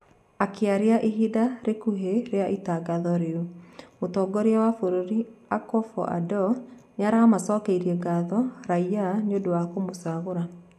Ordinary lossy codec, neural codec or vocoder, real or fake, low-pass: none; none; real; 14.4 kHz